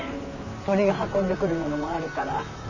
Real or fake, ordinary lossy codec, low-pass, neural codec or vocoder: fake; none; 7.2 kHz; vocoder, 44.1 kHz, 128 mel bands, Pupu-Vocoder